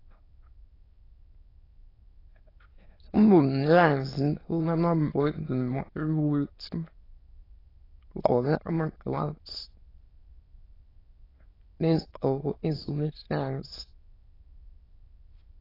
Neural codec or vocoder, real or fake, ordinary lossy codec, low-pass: autoencoder, 22.05 kHz, a latent of 192 numbers a frame, VITS, trained on many speakers; fake; AAC, 24 kbps; 5.4 kHz